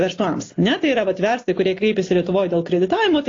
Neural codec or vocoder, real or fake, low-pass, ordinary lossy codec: none; real; 7.2 kHz; AAC, 32 kbps